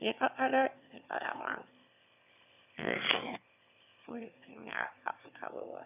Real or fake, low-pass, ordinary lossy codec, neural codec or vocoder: fake; 3.6 kHz; none; autoencoder, 22.05 kHz, a latent of 192 numbers a frame, VITS, trained on one speaker